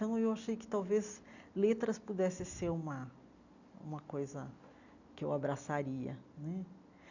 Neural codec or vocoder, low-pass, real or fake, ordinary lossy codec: none; 7.2 kHz; real; none